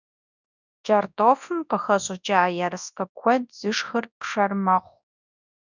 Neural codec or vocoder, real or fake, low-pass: codec, 24 kHz, 0.9 kbps, WavTokenizer, large speech release; fake; 7.2 kHz